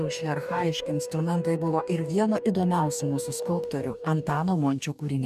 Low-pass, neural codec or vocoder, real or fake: 14.4 kHz; codec, 44.1 kHz, 2.6 kbps, DAC; fake